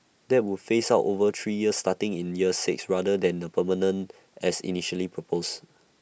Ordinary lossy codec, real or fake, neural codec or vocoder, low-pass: none; real; none; none